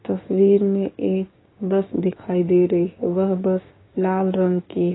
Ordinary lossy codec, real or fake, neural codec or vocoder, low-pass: AAC, 16 kbps; fake; codec, 16 kHz, 6 kbps, DAC; 7.2 kHz